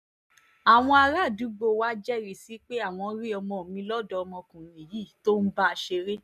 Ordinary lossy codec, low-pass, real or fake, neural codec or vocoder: none; 14.4 kHz; real; none